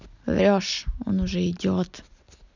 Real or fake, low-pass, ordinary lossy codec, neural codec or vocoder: real; 7.2 kHz; none; none